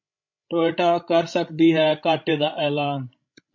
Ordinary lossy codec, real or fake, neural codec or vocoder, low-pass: MP3, 48 kbps; fake; codec, 16 kHz, 16 kbps, FreqCodec, larger model; 7.2 kHz